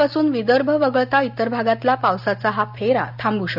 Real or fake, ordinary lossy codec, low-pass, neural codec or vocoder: real; none; 5.4 kHz; none